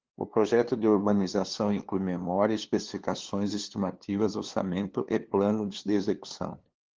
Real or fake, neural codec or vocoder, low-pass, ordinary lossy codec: fake; codec, 16 kHz, 2 kbps, FunCodec, trained on LibriTTS, 25 frames a second; 7.2 kHz; Opus, 16 kbps